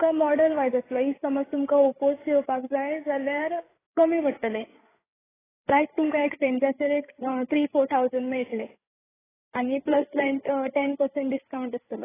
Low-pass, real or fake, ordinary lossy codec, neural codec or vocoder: 3.6 kHz; fake; AAC, 16 kbps; codec, 16 kHz, 8 kbps, FreqCodec, larger model